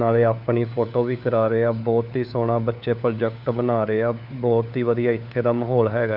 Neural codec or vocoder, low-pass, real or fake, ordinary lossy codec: codec, 16 kHz, 4 kbps, FunCodec, trained on LibriTTS, 50 frames a second; 5.4 kHz; fake; none